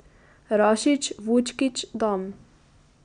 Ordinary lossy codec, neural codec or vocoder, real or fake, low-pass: none; none; real; 9.9 kHz